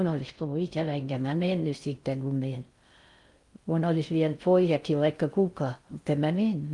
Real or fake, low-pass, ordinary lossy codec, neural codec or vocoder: fake; 10.8 kHz; Opus, 32 kbps; codec, 16 kHz in and 24 kHz out, 0.6 kbps, FocalCodec, streaming, 4096 codes